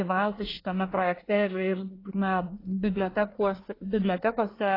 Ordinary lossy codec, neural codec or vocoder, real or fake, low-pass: AAC, 24 kbps; codec, 24 kHz, 1 kbps, SNAC; fake; 5.4 kHz